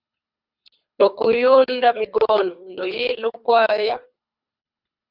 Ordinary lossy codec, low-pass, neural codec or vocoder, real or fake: Opus, 64 kbps; 5.4 kHz; codec, 24 kHz, 3 kbps, HILCodec; fake